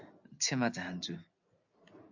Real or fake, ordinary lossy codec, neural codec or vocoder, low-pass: real; AAC, 48 kbps; none; 7.2 kHz